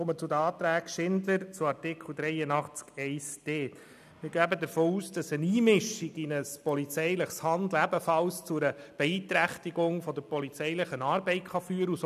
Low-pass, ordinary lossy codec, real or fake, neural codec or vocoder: 14.4 kHz; none; real; none